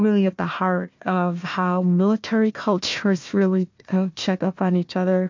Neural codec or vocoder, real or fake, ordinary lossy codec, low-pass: codec, 16 kHz, 1 kbps, FunCodec, trained on Chinese and English, 50 frames a second; fake; MP3, 48 kbps; 7.2 kHz